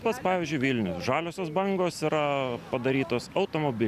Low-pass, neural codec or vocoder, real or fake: 14.4 kHz; none; real